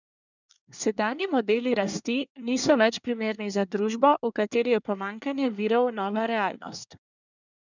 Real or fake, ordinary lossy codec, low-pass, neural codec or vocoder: fake; none; 7.2 kHz; codec, 24 kHz, 1 kbps, SNAC